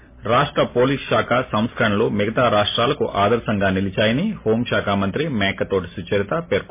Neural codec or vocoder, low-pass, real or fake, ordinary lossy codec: none; 3.6 kHz; real; MP3, 24 kbps